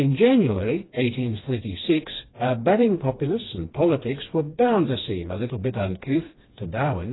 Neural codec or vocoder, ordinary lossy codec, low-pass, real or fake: codec, 16 kHz, 2 kbps, FreqCodec, smaller model; AAC, 16 kbps; 7.2 kHz; fake